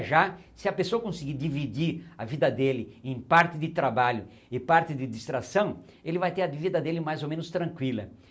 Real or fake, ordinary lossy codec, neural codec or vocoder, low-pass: real; none; none; none